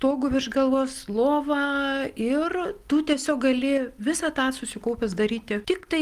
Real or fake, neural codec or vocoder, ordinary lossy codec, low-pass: real; none; Opus, 32 kbps; 14.4 kHz